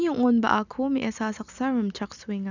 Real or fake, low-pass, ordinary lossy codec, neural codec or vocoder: real; 7.2 kHz; none; none